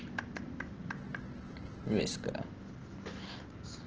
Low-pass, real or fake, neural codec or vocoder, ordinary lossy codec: 7.2 kHz; real; none; Opus, 16 kbps